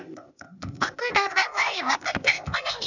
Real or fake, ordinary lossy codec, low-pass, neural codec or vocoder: fake; none; 7.2 kHz; codec, 16 kHz, 0.8 kbps, ZipCodec